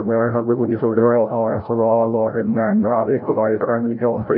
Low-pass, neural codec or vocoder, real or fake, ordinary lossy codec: 5.4 kHz; codec, 16 kHz, 0.5 kbps, FreqCodec, larger model; fake; none